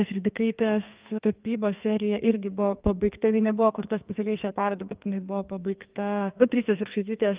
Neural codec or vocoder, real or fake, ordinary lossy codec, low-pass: codec, 44.1 kHz, 2.6 kbps, SNAC; fake; Opus, 24 kbps; 3.6 kHz